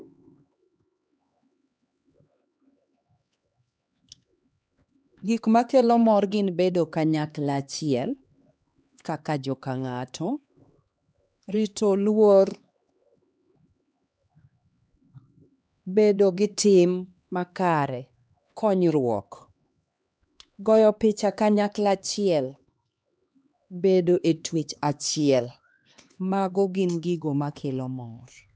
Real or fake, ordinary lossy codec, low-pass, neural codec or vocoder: fake; none; none; codec, 16 kHz, 2 kbps, X-Codec, HuBERT features, trained on LibriSpeech